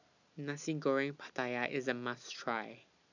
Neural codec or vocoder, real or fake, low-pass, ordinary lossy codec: none; real; 7.2 kHz; none